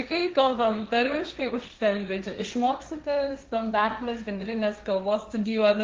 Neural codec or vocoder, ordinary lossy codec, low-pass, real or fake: codec, 16 kHz, 1.1 kbps, Voila-Tokenizer; Opus, 32 kbps; 7.2 kHz; fake